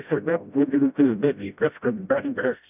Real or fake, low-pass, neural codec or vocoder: fake; 3.6 kHz; codec, 16 kHz, 0.5 kbps, FreqCodec, smaller model